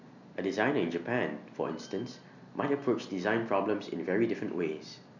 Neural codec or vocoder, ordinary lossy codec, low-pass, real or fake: none; none; 7.2 kHz; real